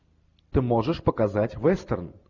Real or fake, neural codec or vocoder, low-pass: real; none; 7.2 kHz